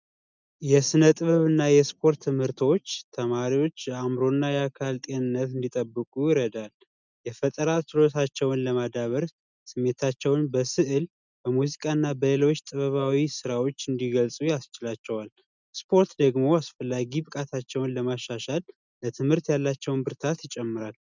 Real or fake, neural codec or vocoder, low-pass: real; none; 7.2 kHz